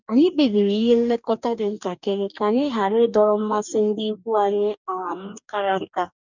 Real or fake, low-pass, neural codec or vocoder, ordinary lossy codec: fake; 7.2 kHz; codec, 44.1 kHz, 2.6 kbps, DAC; none